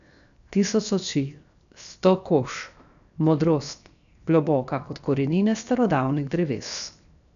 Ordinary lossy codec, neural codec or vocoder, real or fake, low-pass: none; codec, 16 kHz, 0.7 kbps, FocalCodec; fake; 7.2 kHz